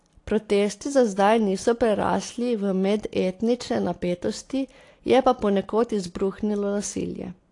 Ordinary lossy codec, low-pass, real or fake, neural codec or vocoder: AAC, 48 kbps; 10.8 kHz; real; none